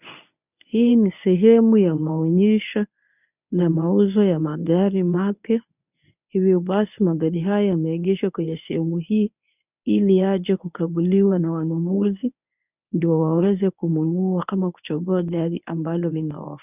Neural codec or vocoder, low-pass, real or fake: codec, 24 kHz, 0.9 kbps, WavTokenizer, medium speech release version 1; 3.6 kHz; fake